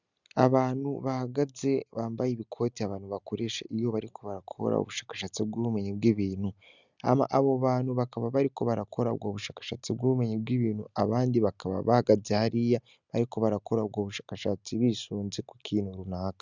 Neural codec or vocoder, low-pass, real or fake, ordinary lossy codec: none; 7.2 kHz; real; Opus, 64 kbps